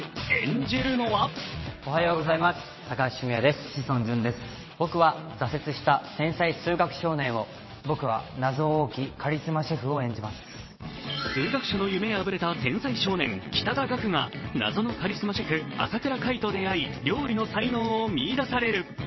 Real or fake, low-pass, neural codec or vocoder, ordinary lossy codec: fake; 7.2 kHz; vocoder, 22.05 kHz, 80 mel bands, WaveNeXt; MP3, 24 kbps